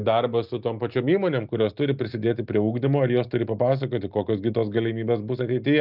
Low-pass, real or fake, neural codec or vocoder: 5.4 kHz; real; none